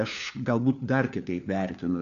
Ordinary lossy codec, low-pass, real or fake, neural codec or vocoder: AAC, 96 kbps; 7.2 kHz; fake; codec, 16 kHz, 4 kbps, FunCodec, trained on LibriTTS, 50 frames a second